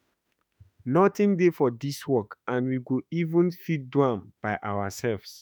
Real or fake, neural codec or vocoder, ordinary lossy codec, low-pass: fake; autoencoder, 48 kHz, 32 numbers a frame, DAC-VAE, trained on Japanese speech; none; none